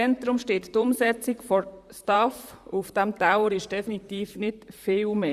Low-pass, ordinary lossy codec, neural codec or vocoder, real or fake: 14.4 kHz; Opus, 64 kbps; vocoder, 44.1 kHz, 128 mel bands, Pupu-Vocoder; fake